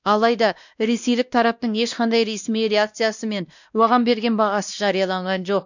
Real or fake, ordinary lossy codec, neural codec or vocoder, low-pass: fake; none; codec, 16 kHz, 1 kbps, X-Codec, WavLM features, trained on Multilingual LibriSpeech; 7.2 kHz